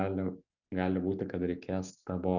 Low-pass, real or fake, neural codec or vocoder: 7.2 kHz; real; none